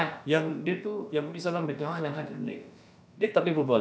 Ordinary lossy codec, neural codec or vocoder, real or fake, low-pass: none; codec, 16 kHz, about 1 kbps, DyCAST, with the encoder's durations; fake; none